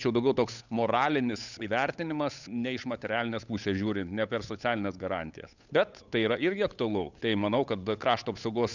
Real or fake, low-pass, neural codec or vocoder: fake; 7.2 kHz; codec, 16 kHz, 8 kbps, FunCodec, trained on Chinese and English, 25 frames a second